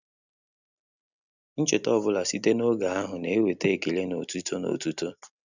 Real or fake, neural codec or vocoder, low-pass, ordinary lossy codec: real; none; 7.2 kHz; none